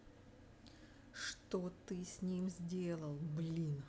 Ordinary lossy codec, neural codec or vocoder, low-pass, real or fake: none; none; none; real